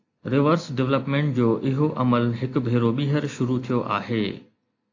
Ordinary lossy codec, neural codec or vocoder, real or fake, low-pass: AAC, 48 kbps; none; real; 7.2 kHz